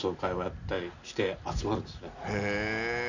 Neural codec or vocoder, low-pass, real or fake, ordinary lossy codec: none; 7.2 kHz; real; none